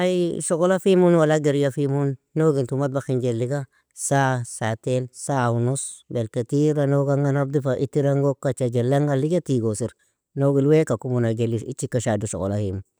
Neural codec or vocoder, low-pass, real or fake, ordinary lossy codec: none; none; real; none